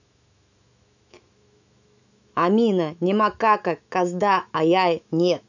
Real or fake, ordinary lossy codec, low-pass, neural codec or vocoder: fake; none; 7.2 kHz; autoencoder, 48 kHz, 128 numbers a frame, DAC-VAE, trained on Japanese speech